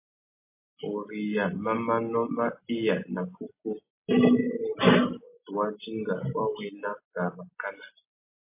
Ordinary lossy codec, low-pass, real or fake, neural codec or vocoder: MP3, 24 kbps; 3.6 kHz; real; none